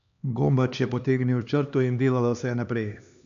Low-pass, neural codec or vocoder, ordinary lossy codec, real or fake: 7.2 kHz; codec, 16 kHz, 2 kbps, X-Codec, HuBERT features, trained on LibriSpeech; none; fake